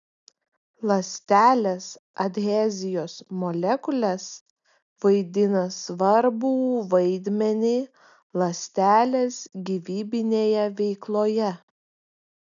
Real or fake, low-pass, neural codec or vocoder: real; 7.2 kHz; none